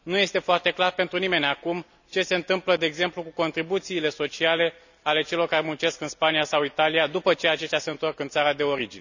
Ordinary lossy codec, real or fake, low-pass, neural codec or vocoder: none; real; 7.2 kHz; none